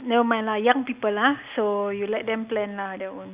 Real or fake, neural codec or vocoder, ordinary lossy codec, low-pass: real; none; Opus, 64 kbps; 3.6 kHz